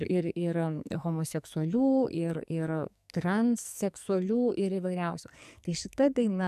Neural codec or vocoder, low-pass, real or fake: codec, 44.1 kHz, 2.6 kbps, SNAC; 14.4 kHz; fake